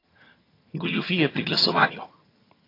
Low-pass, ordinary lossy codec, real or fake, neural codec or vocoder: 5.4 kHz; AAC, 24 kbps; fake; vocoder, 22.05 kHz, 80 mel bands, HiFi-GAN